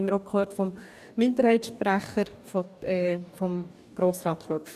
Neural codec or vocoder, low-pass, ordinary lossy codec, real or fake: codec, 44.1 kHz, 2.6 kbps, DAC; 14.4 kHz; none; fake